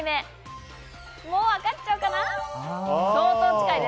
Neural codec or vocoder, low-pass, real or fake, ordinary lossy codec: none; none; real; none